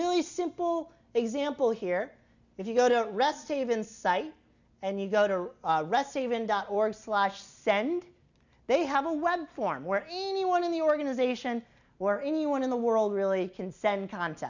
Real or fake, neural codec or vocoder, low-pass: real; none; 7.2 kHz